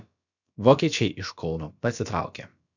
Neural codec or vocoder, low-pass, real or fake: codec, 16 kHz, about 1 kbps, DyCAST, with the encoder's durations; 7.2 kHz; fake